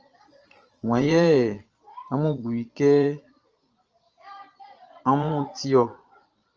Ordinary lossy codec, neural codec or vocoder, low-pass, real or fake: Opus, 24 kbps; vocoder, 22.05 kHz, 80 mel bands, Vocos; 7.2 kHz; fake